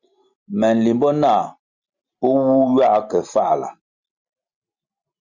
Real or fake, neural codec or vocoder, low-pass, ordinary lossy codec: real; none; 7.2 kHz; Opus, 64 kbps